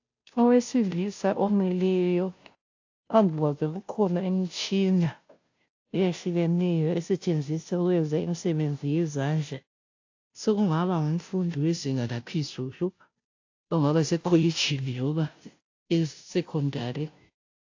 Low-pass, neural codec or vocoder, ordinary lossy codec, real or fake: 7.2 kHz; codec, 16 kHz, 0.5 kbps, FunCodec, trained on Chinese and English, 25 frames a second; AAC, 48 kbps; fake